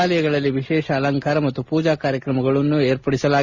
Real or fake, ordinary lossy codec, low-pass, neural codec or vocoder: real; Opus, 64 kbps; 7.2 kHz; none